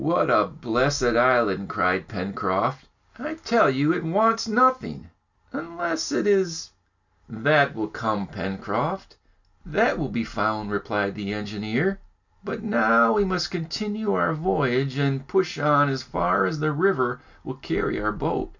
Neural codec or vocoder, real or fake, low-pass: none; real; 7.2 kHz